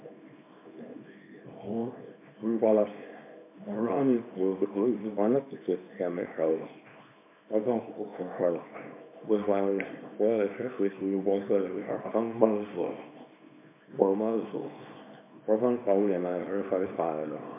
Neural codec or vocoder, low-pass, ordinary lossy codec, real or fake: codec, 24 kHz, 0.9 kbps, WavTokenizer, small release; 3.6 kHz; MP3, 32 kbps; fake